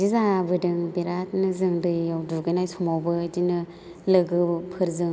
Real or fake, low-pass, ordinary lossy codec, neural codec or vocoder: real; none; none; none